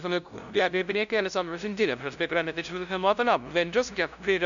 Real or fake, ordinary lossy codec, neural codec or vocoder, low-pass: fake; MP3, 96 kbps; codec, 16 kHz, 0.5 kbps, FunCodec, trained on LibriTTS, 25 frames a second; 7.2 kHz